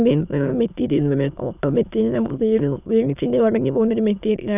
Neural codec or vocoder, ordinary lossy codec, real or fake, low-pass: autoencoder, 22.05 kHz, a latent of 192 numbers a frame, VITS, trained on many speakers; none; fake; 3.6 kHz